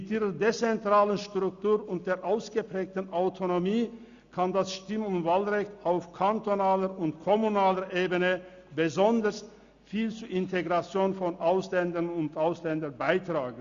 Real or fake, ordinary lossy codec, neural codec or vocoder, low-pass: real; Opus, 64 kbps; none; 7.2 kHz